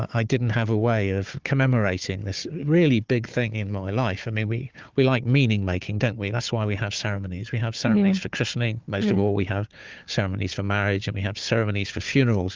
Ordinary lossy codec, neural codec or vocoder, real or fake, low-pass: Opus, 32 kbps; codec, 16 kHz, 4 kbps, FunCodec, trained on Chinese and English, 50 frames a second; fake; 7.2 kHz